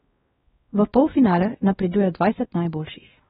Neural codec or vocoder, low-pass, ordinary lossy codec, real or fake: codec, 16 kHz, 1 kbps, X-Codec, WavLM features, trained on Multilingual LibriSpeech; 7.2 kHz; AAC, 16 kbps; fake